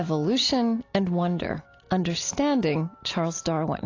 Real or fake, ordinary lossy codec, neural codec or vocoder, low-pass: real; AAC, 32 kbps; none; 7.2 kHz